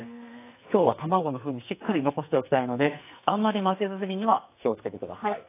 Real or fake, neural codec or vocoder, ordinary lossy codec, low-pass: fake; codec, 44.1 kHz, 2.6 kbps, SNAC; AAC, 24 kbps; 3.6 kHz